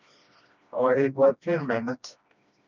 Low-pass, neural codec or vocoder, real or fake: 7.2 kHz; codec, 16 kHz, 1 kbps, FreqCodec, smaller model; fake